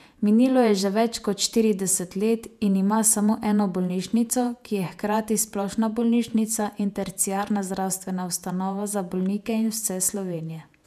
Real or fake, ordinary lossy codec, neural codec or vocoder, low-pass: fake; none; vocoder, 48 kHz, 128 mel bands, Vocos; 14.4 kHz